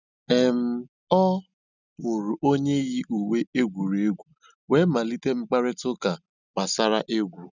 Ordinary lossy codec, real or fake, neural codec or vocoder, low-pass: none; real; none; 7.2 kHz